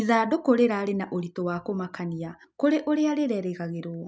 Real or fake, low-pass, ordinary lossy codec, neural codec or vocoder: real; none; none; none